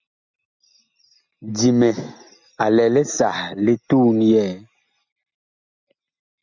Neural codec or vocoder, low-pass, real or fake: none; 7.2 kHz; real